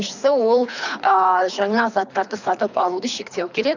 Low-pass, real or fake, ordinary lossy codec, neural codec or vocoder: 7.2 kHz; fake; none; codec, 24 kHz, 3 kbps, HILCodec